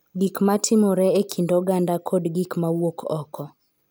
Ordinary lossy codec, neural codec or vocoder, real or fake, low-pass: none; none; real; none